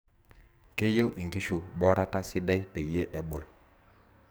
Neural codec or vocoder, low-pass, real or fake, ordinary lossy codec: codec, 44.1 kHz, 2.6 kbps, SNAC; none; fake; none